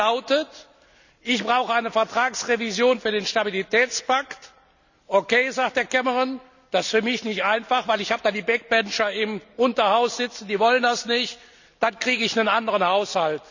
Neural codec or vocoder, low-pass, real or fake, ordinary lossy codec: none; 7.2 kHz; real; none